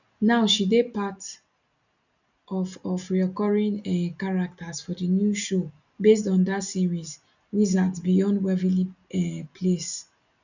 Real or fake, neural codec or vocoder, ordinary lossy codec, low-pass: real; none; none; 7.2 kHz